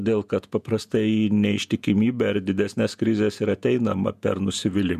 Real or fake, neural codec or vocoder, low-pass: fake; vocoder, 48 kHz, 128 mel bands, Vocos; 14.4 kHz